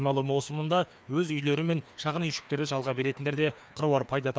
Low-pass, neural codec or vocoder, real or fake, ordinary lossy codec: none; codec, 16 kHz, 2 kbps, FreqCodec, larger model; fake; none